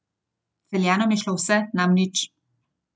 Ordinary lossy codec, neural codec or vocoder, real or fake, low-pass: none; none; real; none